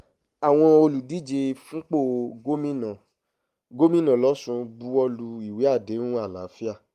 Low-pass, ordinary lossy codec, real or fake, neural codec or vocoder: 14.4 kHz; Opus, 32 kbps; real; none